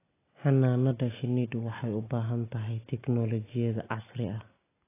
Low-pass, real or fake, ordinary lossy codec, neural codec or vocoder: 3.6 kHz; real; AAC, 16 kbps; none